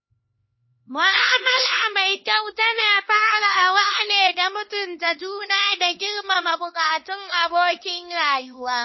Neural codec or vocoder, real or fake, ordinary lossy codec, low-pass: codec, 16 kHz, 2 kbps, X-Codec, HuBERT features, trained on LibriSpeech; fake; MP3, 24 kbps; 7.2 kHz